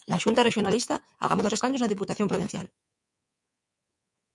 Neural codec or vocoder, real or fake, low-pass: codec, 24 kHz, 3.1 kbps, DualCodec; fake; 10.8 kHz